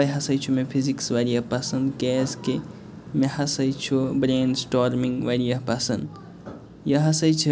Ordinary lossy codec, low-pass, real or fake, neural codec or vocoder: none; none; real; none